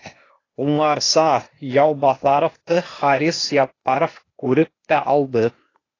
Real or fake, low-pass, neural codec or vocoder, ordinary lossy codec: fake; 7.2 kHz; codec, 16 kHz, 0.8 kbps, ZipCodec; AAC, 32 kbps